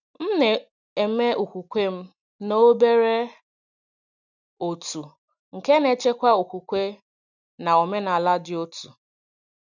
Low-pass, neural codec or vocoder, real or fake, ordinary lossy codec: 7.2 kHz; none; real; none